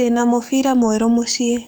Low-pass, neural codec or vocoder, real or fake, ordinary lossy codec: none; codec, 44.1 kHz, 7.8 kbps, DAC; fake; none